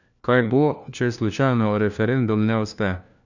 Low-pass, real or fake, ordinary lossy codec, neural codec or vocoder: 7.2 kHz; fake; none; codec, 16 kHz, 1 kbps, FunCodec, trained on LibriTTS, 50 frames a second